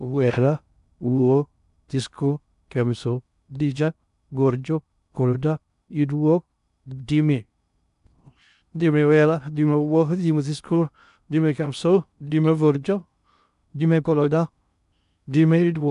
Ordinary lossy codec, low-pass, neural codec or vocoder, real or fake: none; 10.8 kHz; codec, 16 kHz in and 24 kHz out, 0.6 kbps, FocalCodec, streaming, 2048 codes; fake